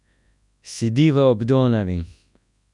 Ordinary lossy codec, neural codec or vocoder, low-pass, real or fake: none; codec, 24 kHz, 0.9 kbps, WavTokenizer, large speech release; 10.8 kHz; fake